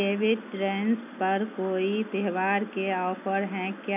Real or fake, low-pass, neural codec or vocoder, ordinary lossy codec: real; 3.6 kHz; none; none